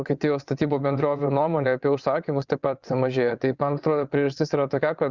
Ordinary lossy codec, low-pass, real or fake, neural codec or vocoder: Opus, 64 kbps; 7.2 kHz; fake; vocoder, 22.05 kHz, 80 mel bands, WaveNeXt